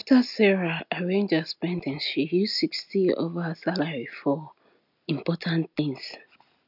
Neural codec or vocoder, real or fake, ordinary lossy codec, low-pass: none; real; none; 5.4 kHz